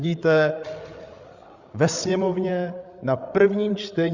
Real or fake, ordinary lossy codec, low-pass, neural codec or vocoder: fake; Opus, 64 kbps; 7.2 kHz; codec, 16 kHz, 8 kbps, FreqCodec, larger model